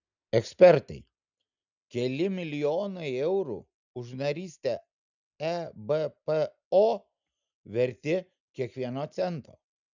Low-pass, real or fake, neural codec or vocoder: 7.2 kHz; real; none